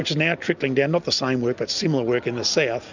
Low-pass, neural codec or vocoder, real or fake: 7.2 kHz; none; real